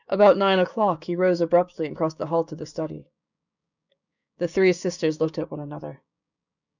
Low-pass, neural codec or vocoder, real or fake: 7.2 kHz; codec, 16 kHz, 6 kbps, DAC; fake